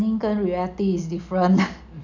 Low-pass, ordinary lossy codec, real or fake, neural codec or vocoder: 7.2 kHz; none; real; none